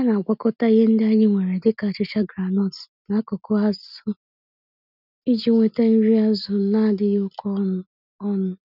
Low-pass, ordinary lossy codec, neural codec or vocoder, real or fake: 5.4 kHz; none; none; real